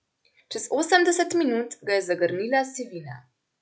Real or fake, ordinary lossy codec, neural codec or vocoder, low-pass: real; none; none; none